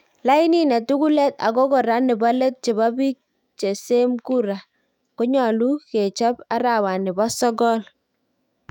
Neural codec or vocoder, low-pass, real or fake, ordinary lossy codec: autoencoder, 48 kHz, 128 numbers a frame, DAC-VAE, trained on Japanese speech; 19.8 kHz; fake; none